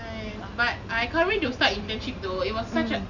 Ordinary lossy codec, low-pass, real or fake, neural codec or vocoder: none; 7.2 kHz; real; none